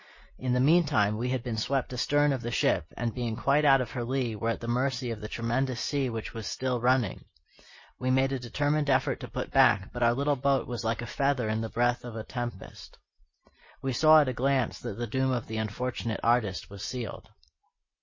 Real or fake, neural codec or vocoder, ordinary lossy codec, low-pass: real; none; MP3, 32 kbps; 7.2 kHz